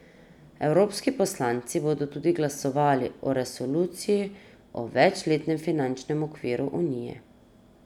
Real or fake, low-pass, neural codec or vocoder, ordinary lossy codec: real; 19.8 kHz; none; none